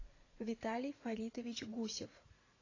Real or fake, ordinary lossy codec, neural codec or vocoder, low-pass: fake; AAC, 32 kbps; vocoder, 44.1 kHz, 80 mel bands, Vocos; 7.2 kHz